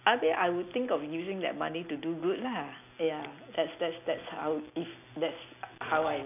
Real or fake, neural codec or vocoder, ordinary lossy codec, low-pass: real; none; none; 3.6 kHz